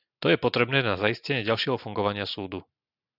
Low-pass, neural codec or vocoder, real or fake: 5.4 kHz; none; real